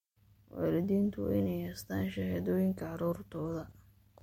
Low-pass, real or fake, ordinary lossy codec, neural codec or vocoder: 19.8 kHz; real; MP3, 64 kbps; none